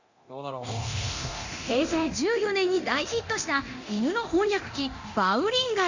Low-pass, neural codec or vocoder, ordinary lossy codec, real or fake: 7.2 kHz; codec, 24 kHz, 0.9 kbps, DualCodec; Opus, 64 kbps; fake